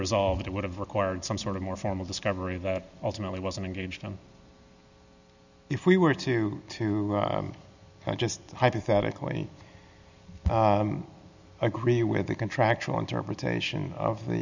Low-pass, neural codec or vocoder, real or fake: 7.2 kHz; none; real